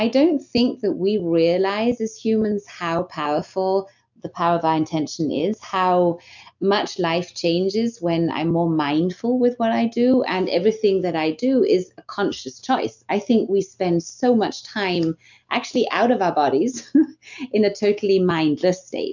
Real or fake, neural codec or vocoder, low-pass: real; none; 7.2 kHz